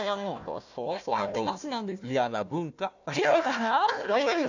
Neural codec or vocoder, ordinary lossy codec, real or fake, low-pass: codec, 16 kHz, 1 kbps, FunCodec, trained on Chinese and English, 50 frames a second; none; fake; 7.2 kHz